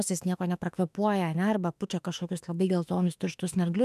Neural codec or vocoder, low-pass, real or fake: autoencoder, 48 kHz, 32 numbers a frame, DAC-VAE, trained on Japanese speech; 14.4 kHz; fake